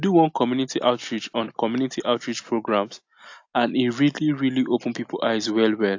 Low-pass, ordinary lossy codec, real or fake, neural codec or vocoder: 7.2 kHz; AAC, 48 kbps; real; none